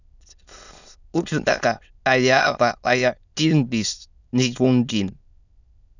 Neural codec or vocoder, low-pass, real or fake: autoencoder, 22.05 kHz, a latent of 192 numbers a frame, VITS, trained on many speakers; 7.2 kHz; fake